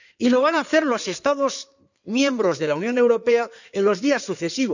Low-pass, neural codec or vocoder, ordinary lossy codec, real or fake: 7.2 kHz; codec, 16 kHz in and 24 kHz out, 2.2 kbps, FireRedTTS-2 codec; none; fake